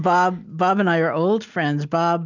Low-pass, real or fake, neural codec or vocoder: 7.2 kHz; real; none